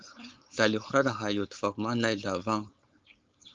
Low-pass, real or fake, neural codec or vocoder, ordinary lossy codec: 7.2 kHz; fake; codec, 16 kHz, 4.8 kbps, FACodec; Opus, 32 kbps